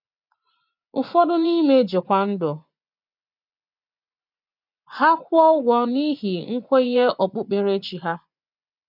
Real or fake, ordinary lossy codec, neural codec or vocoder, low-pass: fake; none; vocoder, 24 kHz, 100 mel bands, Vocos; 5.4 kHz